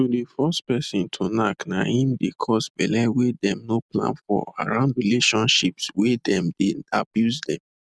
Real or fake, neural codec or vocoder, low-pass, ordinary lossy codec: real; none; none; none